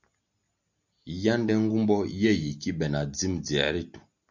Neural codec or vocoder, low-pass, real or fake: none; 7.2 kHz; real